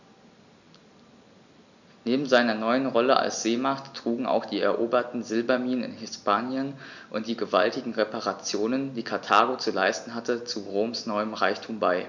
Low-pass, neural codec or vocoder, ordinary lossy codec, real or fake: 7.2 kHz; none; none; real